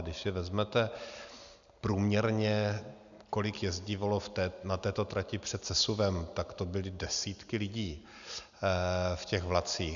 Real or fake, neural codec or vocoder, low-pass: real; none; 7.2 kHz